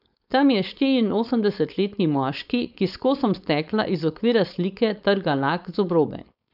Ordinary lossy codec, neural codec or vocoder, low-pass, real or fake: none; codec, 16 kHz, 4.8 kbps, FACodec; 5.4 kHz; fake